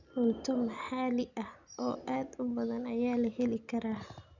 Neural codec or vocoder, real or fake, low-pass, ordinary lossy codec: none; real; 7.2 kHz; none